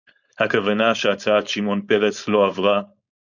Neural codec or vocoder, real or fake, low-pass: codec, 16 kHz, 4.8 kbps, FACodec; fake; 7.2 kHz